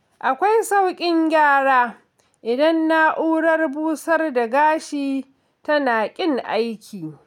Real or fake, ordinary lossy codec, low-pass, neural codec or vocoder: real; none; 19.8 kHz; none